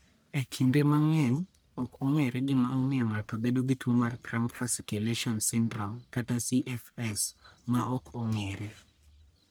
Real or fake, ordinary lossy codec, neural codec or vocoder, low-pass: fake; none; codec, 44.1 kHz, 1.7 kbps, Pupu-Codec; none